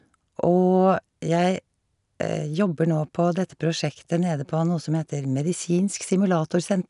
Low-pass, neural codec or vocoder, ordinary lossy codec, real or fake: 10.8 kHz; none; none; real